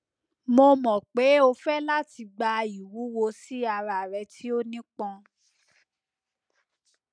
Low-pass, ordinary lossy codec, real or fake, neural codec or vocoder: 9.9 kHz; none; real; none